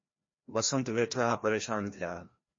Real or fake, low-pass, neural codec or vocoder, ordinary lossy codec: fake; 7.2 kHz; codec, 16 kHz, 1 kbps, FreqCodec, larger model; MP3, 32 kbps